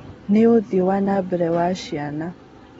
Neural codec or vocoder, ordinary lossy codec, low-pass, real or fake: none; AAC, 24 kbps; 19.8 kHz; real